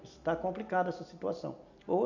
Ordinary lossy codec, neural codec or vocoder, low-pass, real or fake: none; none; 7.2 kHz; real